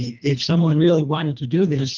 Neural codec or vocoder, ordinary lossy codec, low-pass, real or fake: codec, 24 kHz, 1.5 kbps, HILCodec; Opus, 24 kbps; 7.2 kHz; fake